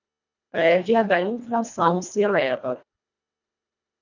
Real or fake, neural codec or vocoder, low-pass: fake; codec, 24 kHz, 1.5 kbps, HILCodec; 7.2 kHz